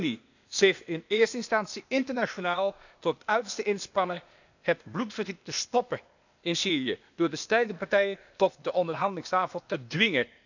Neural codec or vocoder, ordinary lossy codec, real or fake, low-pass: codec, 16 kHz, 0.8 kbps, ZipCodec; none; fake; 7.2 kHz